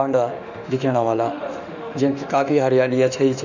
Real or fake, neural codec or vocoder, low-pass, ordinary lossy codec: fake; autoencoder, 48 kHz, 32 numbers a frame, DAC-VAE, trained on Japanese speech; 7.2 kHz; none